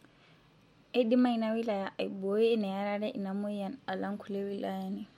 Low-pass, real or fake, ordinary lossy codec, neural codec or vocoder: 19.8 kHz; real; MP3, 64 kbps; none